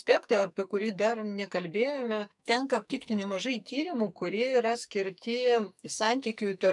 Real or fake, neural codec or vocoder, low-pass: fake; codec, 44.1 kHz, 2.6 kbps, SNAC; 10.8 kHz